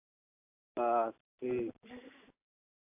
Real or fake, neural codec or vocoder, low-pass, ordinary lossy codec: real; none; 3.6 kHz; AAC, 24 kbps